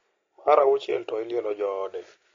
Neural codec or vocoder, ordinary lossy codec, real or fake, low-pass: none; AAC, 32 kbps; real; 7.2 kHz